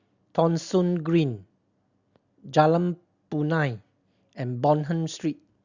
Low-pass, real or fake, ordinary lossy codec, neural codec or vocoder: 7.2 kHz; real; Opus, 64 kbps; none